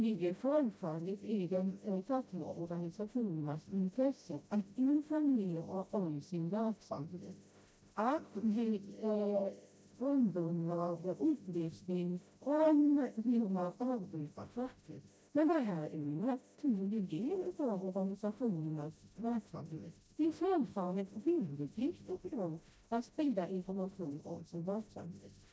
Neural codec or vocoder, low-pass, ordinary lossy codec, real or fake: codec, 16 kHz, 0.5 kbps, FreqCodec, smaller model; none; none; fake